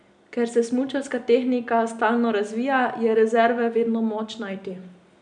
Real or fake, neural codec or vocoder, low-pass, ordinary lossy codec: real; none; 9.9 kHz; none